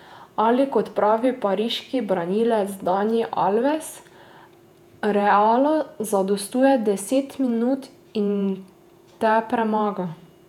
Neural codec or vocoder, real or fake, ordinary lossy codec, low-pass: vocoder, 48 kHz, 128 mel bands, Vocos; fake; none; 19.8 kHz